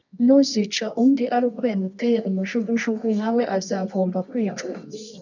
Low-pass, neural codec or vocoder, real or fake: 7.2 kHz; codec, 24 kHz, 0.9 kbps, WavTokenizer, medium music audio release; fake